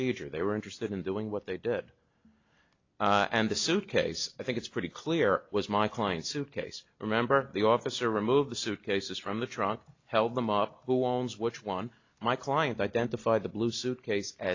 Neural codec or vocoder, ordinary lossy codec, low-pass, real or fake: none; AAC, 48 kbps; 7.2 kHz; real